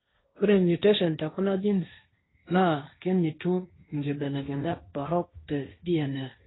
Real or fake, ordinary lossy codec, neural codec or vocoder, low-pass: fake; AAC, 16 kbps; codec, 16 kHz, 1.1 kbps, Voila-Tokenizer; 7.2 kHz